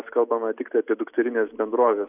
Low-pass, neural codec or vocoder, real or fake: 3.6 kHz; none; real